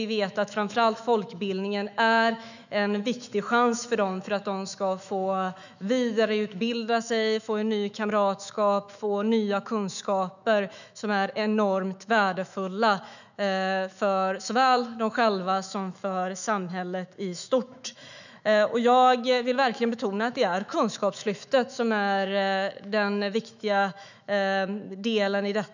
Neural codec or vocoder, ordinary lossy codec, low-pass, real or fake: autoencoder, 48 kHz, 128 numbers a frame, DAC-VAE, trained on Japanese speech; none; 7.2 kHz; fake